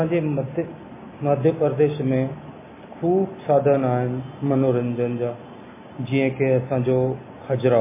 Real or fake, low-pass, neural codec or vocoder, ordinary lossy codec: real; 3.6 kHz; none; MP3, 16 kbps